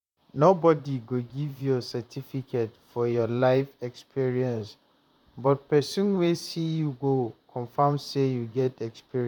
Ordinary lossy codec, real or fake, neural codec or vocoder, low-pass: none; fake; vocoder, 44.1 kHz, 128 mel bands, Pupu-Vocoder; 19.8 kHz